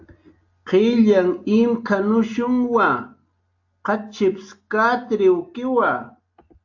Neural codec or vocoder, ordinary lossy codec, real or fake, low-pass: none; AAC, 48 kbps; real; 7.2 kHz